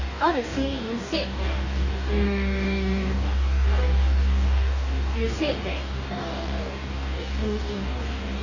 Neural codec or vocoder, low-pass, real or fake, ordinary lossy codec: codec, 44.1 kHz, 2.6 kbps, DAC; 7.2 kHz; fake; none